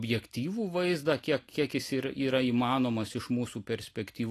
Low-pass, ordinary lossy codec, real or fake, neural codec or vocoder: 14.4 kHz; AAC, 48 kbps; real; none